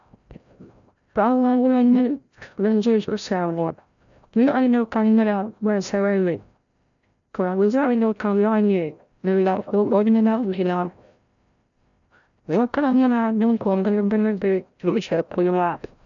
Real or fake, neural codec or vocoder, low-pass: fake; codec, 16 kHz, 0.5 kbps, FreqCodec, larger model; 7.2 kHz